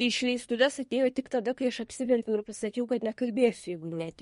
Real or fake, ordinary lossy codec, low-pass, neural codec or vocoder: fake; MP3, 48 kbps; 10.8 kHz; codec, 24 kHz, 1 kbps, SNAC